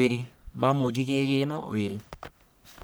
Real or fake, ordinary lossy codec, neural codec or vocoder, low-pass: fake; none; codec, 44.1 kHz, 1.7 kbps, Pupu-Codec; none